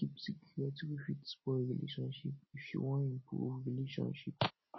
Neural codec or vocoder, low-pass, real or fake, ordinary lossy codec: none; 7.2 kHz; real; MP3, 24 kbps